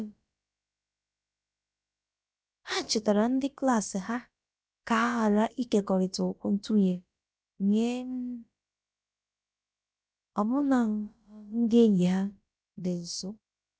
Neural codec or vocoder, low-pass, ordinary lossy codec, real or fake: codec, 16 kHz, about 1 kbps, DyCAST, with the encoder's durations; none; none; fake